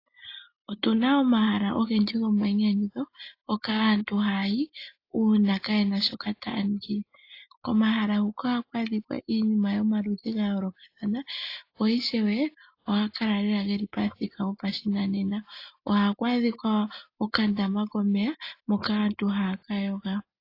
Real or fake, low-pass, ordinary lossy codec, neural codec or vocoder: real; 5.4 kHz; AAC, 32 kbps; none